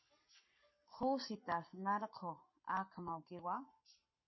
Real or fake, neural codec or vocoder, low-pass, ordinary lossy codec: fake; codec, 44.1 kHz, 7.8 kbps, Pupu-Codec; 7.2 kHz; MP3, 24 kbps